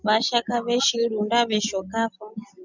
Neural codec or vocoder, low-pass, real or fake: none; 7.2 kHz; real